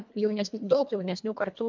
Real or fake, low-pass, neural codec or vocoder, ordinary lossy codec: fake; 7.2 kHz; codec, 24 kHz, 1.5 kbps, HILCodec; MP3, 64 kbps